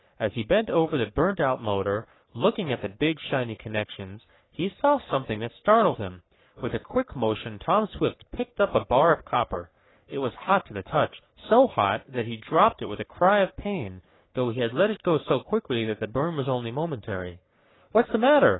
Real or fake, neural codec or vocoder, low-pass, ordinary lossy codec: fake; codec, 44.1 kHz, 3.4 kbps, Pupu-Codec; 7.2 kHz; AAC, 16 kbps